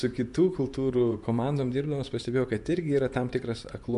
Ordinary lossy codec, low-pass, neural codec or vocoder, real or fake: MP3, 64 kbps; 10.8 kHz; vocoder, 24 kHz, 100 mel bands, Vocos; fake